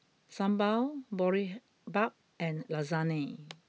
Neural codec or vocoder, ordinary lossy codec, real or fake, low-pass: none; none; real; none